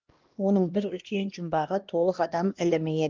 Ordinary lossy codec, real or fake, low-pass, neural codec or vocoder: Opus, 32 kbps; fake; 7.2 kHz; codec, 16 kHz, 1 kbps, X-Codec, HuBERT features, trained on LibriSpeech